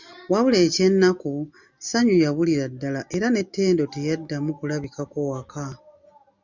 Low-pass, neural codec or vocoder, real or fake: 7.2 kHz; none; real